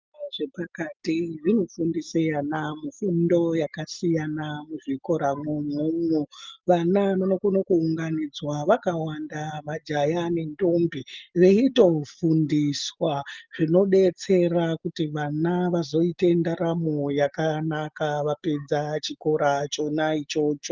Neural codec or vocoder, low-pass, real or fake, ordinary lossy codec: none; 7.2 kHz; real; Opus, 32 kbps